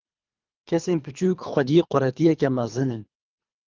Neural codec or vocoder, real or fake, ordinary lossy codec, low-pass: codec, 24 kHz, 3 kbps, HILCodec; fake; Opus, 24 kbps; 7.2 kHz